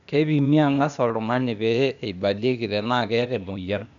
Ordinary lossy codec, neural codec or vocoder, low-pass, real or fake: none; codec, 16 kHz, 0.8 kbps, ZipCodec; 7.2 kHz; fake